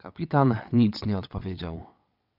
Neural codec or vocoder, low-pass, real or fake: vocoder, 22.05 kHz, 80 mel bands, WaveNeXt; 5.4 kHz; fake